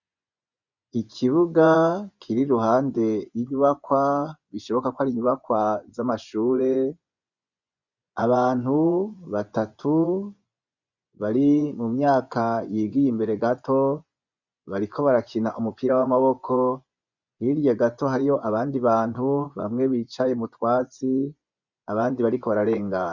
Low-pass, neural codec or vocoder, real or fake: 7.2 kHz; vocoder, 24 kHz, 100 mel bands, Vocos; fake